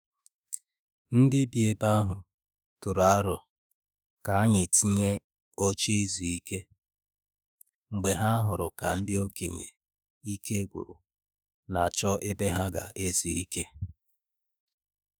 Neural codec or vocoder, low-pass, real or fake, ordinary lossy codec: autoencoder, 48 kHz, 32 numbers a frame, DAC-VAE, trained on Japanese speech; none; fake; none